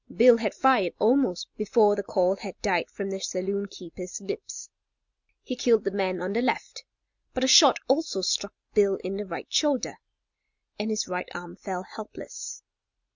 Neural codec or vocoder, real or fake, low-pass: none; real; 7.2 kHz